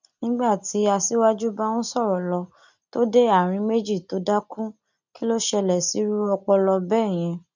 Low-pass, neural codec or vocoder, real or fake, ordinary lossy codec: 7.2 kHz; none; real; none